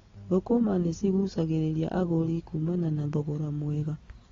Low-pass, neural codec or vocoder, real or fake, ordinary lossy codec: 7.2 kHz; none; real; AAC, 24 kbps